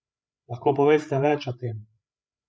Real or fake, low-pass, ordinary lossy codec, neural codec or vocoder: fake; none; none; codec, 16 kHz, 8 kbps, FreqCodec, larger model